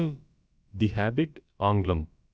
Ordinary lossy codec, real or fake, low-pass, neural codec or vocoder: none; fake; none; codec, 16 kHz, about 1 kbps, DyCAST, with the encoder's durations